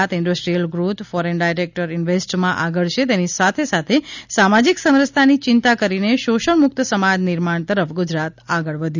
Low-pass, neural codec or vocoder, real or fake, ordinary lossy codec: 7.2 kHz; none; real; none